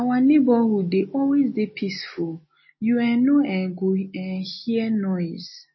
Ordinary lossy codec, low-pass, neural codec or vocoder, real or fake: MP3, 24 kbps; 7.2 kHz; none; real